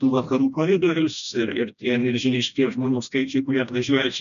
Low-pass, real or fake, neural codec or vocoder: 7.2 kHz; fake; codec, 16 kHz, 1 kbps, FreqCodec, smaller model